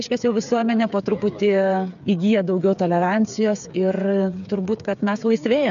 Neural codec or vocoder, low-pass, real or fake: codec, 16 kHz, 8 kbps, FreqCodec, smaller model; 7.2 kHz; fake